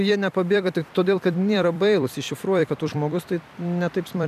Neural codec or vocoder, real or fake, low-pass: vocoder, 44.1 kHz, 128 mel bands every 256 samples, BigVGAN v2; fake; 14.4 kHz